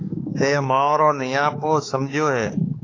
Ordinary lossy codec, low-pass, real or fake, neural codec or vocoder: AAC, 32 kbps; 7.2 kHz; fake; codec, 16 kHz, 4 kbps, X-Codec, HuBERT features, trained on balanced general audio